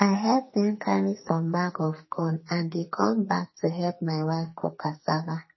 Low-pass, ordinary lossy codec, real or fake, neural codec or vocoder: 7.2 kHz; MP3, 24 kbps; fake; codec, 44.1 kHz, 2.6 kbps, SNAC